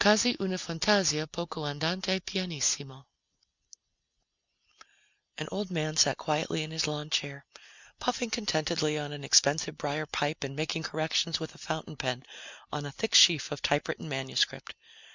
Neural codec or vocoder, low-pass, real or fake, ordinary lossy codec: none; 7.2 kHz; real; Opus, 64 kbps